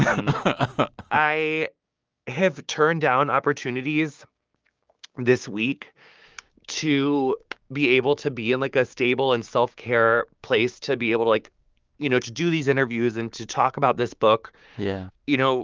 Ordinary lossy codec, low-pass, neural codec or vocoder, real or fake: Opus, 24 kbps; 7.2 kHz; codec, 16 kHz, 6 kbps, DAC; fake